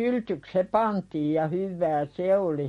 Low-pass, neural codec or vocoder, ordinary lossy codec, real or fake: 19.8 kHz; none; MP3, 48 kbps; real